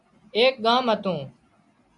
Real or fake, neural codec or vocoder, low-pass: real; none; 10.8 kHz